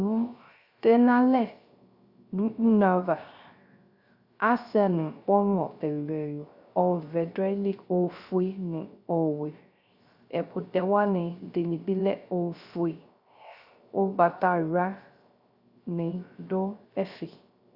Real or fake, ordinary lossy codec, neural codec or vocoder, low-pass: fake; Opus, 64 kbps; codec, 16 kHz, 0.3 kbps, FocalCodec; 5.4 kHz